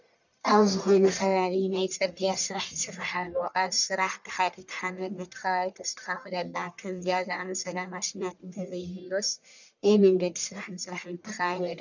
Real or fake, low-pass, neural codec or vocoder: fake; 7.2 kHz; codec, 44.1 kHz, 1.7 kbps, Pupu-Codec